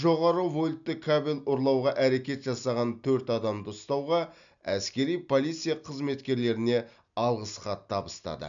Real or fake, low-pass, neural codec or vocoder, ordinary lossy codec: real; 7.2 kHz; none; none